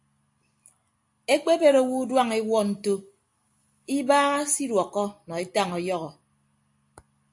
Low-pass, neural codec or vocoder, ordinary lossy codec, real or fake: 10.8 kHz; none; AAC, 64 kbps; real